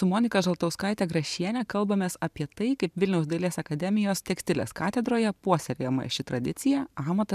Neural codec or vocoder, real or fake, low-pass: vocoder, 44.1 kHz, 128 mel bands every 512 samples, BigVGAN v2; fake; 14.4 kHz